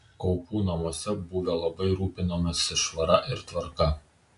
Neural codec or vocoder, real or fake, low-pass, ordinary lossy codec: none; real; 10.8 kHz; AAC, 96 kbps